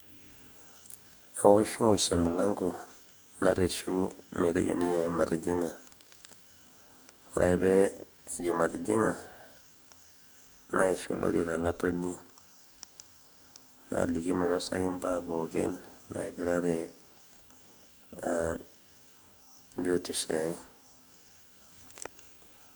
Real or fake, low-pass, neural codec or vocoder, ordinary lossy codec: fake; none; codec, 44.1 kHz, 2.6 kbps, DAC; none